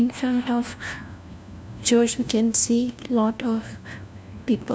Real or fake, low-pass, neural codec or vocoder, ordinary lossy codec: fake; none; codec, 16 kHz, 1 kbps, FunCodec, trained on LibriTTS, 50 frames a second; none